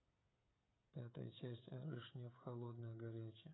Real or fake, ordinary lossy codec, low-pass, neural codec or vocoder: fake; AAC, 16 kbps; 7.2 kHz; codec, 16 kHz, 16 kbps, FreqCodec, smaller model